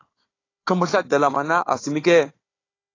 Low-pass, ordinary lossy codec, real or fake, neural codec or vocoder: 7.2 kHz; AAC, 32 kbps; fake; codec, 16 kHz, 4 kbps, FunCodec, trained on Chinese and English, 50 frames a second